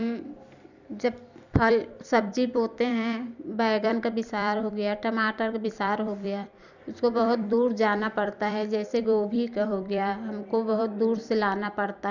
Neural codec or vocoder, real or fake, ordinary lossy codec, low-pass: vocoder, 44.1 kHz, 128 mel bands every 256 samples, BigVGAN v2; fake; none; 7.2 kHz